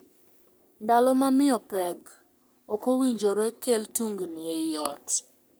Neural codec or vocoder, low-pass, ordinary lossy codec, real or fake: codec, 44.1 kHz, 3.4 kbps, Pupu-Codec; none; none; fake